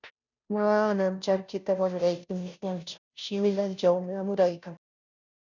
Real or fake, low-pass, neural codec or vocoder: fake; 7.2 kHz; codec, 16 kHz, 0.5 kbps, FunCodec, trained on Chinese and English, 25 frames a second